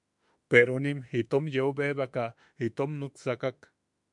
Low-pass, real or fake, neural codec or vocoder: 10.8 kHz; fake; autoencoder, 48 kHz, 32 numbers a frame, DAC-VAE, trained on Japanese speech